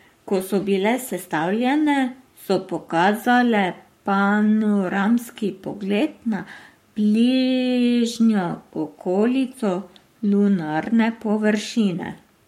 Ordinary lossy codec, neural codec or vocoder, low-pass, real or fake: MP3, 64 kbps; codec, 44.1 kHz, 7.8 kbps, Pupu-Codec; 19.8 kHz; fake